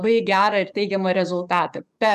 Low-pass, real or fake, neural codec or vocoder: 14.4 kHz; fake; codec, 44.1 kHz, 7.8 kbps, DAC